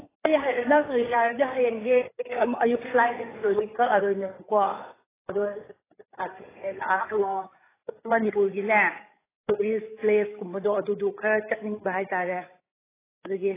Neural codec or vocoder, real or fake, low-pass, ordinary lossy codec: codec, 16 kHz, 8 kbps, FreqCodec, larger model; fake; 3.6 kHz; AAC, 16 kbps